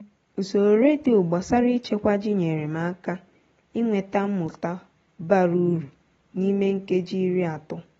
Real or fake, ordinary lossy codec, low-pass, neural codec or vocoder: real; AAC, 24 kbps; 19.8 kHz; none